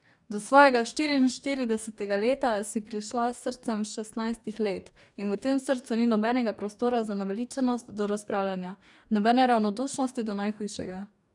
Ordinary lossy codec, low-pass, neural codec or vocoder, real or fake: none; 10.8 kHz; codec, 44.1 kHz, 2.6 kbps, DAC; fake